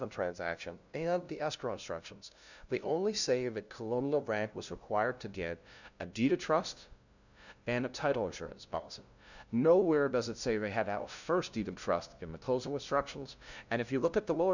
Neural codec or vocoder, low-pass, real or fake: codec, 16 kHz, 0.5 kbps, FunCodec, trained on LibriTTS, 25 frames a second; 7.2 kHz; fake